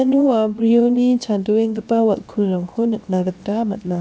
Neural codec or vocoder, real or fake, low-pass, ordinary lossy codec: codec, 16 kHz, 0.7 kbps, FocalCodec; fake; none; none